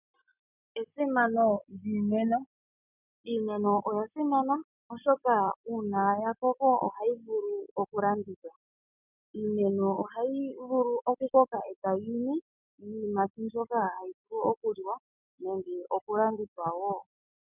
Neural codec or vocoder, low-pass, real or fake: none; 3.6 kHz; real